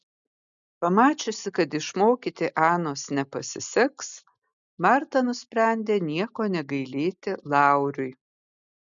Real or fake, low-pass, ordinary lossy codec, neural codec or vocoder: real; 7.2 kHz; MP3, 96 kbps; none